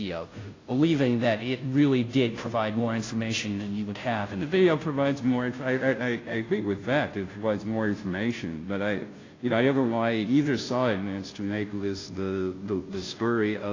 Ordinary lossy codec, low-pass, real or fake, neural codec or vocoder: AAC, 32 kbps; 7.2 kHz; fake; codec, 16 kHz, 0.5 kbps, FunCodec, trained on Chinese and English, 25 frames a second